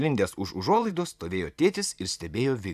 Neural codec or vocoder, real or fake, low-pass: vocoder, 44.1 kHz, 128 mel bands every 512 samples, BigVGAN v2; fake; 14.4 kHz